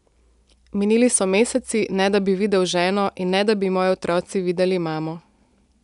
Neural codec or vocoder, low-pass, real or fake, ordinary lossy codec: none; 10.8 kHz; real; none